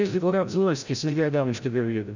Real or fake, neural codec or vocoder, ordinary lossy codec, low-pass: fake; codec, 16 kHz, 0.5 kbps, FreqCodec, larger model; none; 7.2 kHz